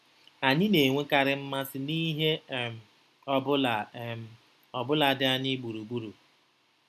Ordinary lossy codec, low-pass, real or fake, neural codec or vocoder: none; 14.4 kHz; real; none